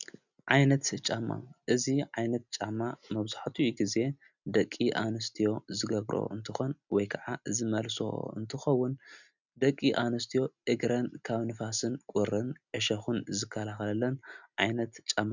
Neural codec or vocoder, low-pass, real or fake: none; 7.2 kHz; real